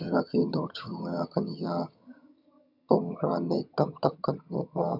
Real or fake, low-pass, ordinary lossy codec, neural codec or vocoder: fake; 5.4 kHz; none; vocoder, 22.05 kHz, 80 mel bands, HiFi-GAN